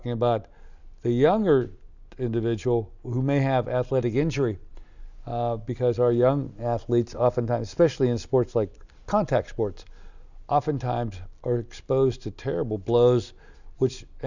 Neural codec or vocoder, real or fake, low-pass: none; real; 7.2 kHz